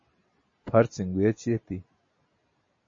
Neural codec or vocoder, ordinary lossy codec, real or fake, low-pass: none; MP3, 32 kbps; real; 7.2 kHz